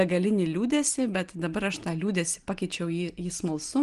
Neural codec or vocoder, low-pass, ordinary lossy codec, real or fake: none; 10.8 kHz; Opus, 24 kbps; real